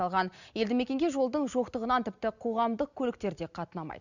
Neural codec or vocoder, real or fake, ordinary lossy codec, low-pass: none; real; none; 7.2 kHz